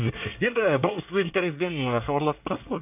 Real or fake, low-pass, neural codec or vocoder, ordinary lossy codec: fake; 3.6 kHz; codec, 24 kHz, 1 kbps, SNAC; none